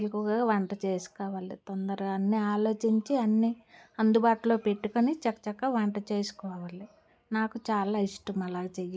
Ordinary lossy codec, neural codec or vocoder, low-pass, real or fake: none; none; none; real